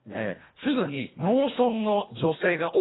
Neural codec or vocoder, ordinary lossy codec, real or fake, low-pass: codec, 24 kHz, 1.5 kbps, HILCodec; AAC, 16 kbps; fake; 7.2 kHz